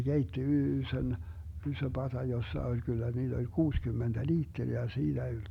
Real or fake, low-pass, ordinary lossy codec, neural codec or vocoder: real; 19.8 kHz; none; none